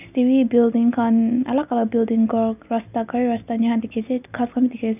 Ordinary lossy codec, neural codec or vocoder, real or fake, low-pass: none; none; real; 3.6 kHz